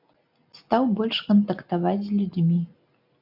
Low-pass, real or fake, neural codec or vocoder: 5.4 kHz; real; none